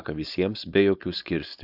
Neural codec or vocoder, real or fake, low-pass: codec, 16 kHz, 4.8 kbps, FACodec; fake; 5.4 kHz